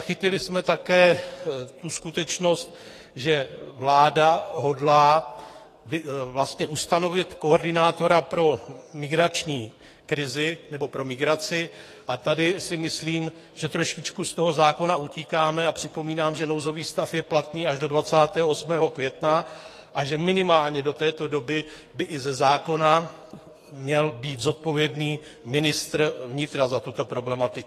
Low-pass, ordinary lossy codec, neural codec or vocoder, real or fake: 14.4 kHz; AAC, 48 kbps; codec, 44.1 kHz, 2.6 kbps, SNAC; fake